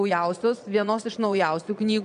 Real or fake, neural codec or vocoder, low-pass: fake; vocoder, 22.05 kHz, 80 mel bands, Vocos; 9.9 kHz